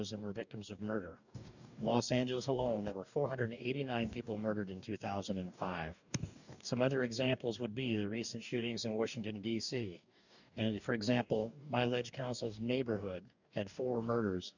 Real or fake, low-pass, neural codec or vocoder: fake; 7.2 kHz; codec, 44.1 kHz, 2.6 kbps, DAC